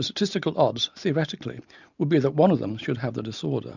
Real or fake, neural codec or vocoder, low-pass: real; none; 7.2 kHz